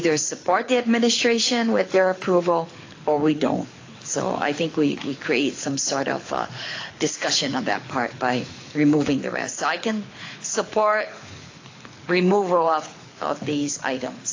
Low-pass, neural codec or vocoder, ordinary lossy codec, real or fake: 7.2 kHz; codec, 24 kHz, 6 kbps, HILCodec; AAC, 32 kbps; fake